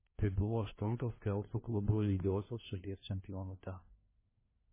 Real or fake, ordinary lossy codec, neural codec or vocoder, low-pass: fake; MP3, 16 kbps; codec, 16 kHz, 1 kbps, FunCodec, trained on LibriTTS, 50 frames a second; 3.6 kHz